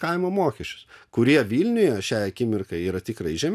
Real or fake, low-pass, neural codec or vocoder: real; 14.4 kHz; none